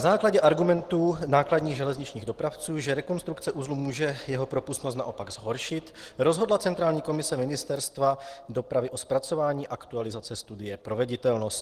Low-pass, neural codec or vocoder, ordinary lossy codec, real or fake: 14.4 kHz; none; Opus, 16 kbps; real